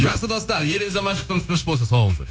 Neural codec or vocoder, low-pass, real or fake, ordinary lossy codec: codec, 16 kHz, 0.9 kbps, LongCat-Audio-Codec; none; fake; none